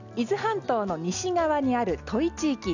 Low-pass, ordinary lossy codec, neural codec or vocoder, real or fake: 7.2 kHz; none; none; real